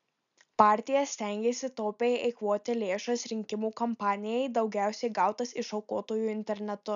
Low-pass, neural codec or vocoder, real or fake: 7.2 kHz; none; real